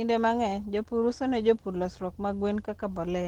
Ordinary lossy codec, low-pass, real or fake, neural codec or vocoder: Opus, 16 kbps; 19.8 kHz; real; none